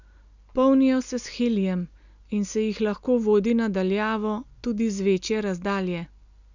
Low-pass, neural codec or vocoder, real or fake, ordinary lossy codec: 7.2 kHz; none; real; none